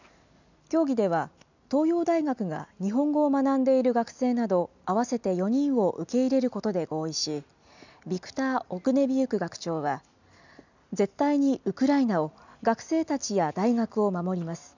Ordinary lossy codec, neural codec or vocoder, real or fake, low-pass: none; none; real; 7.2 kHz